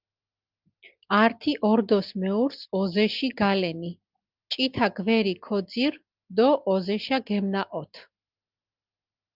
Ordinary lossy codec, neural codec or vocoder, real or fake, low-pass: Opus, 32 kbps; none; real; 5.4 kHz